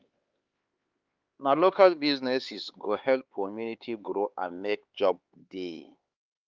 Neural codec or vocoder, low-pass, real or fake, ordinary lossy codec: codec, 16 kHz, 4 kbps, X-Codec, HuBERT features, trained on LibriSpeech; 7.2 kHz; fake; Opus, 32 kbps